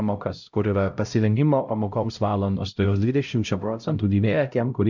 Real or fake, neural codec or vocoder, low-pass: fake; codec, 16 kHz, 0.5 kbps, X-Codec, HuBERT features, trained on LibriSpeech; 7.2 kHz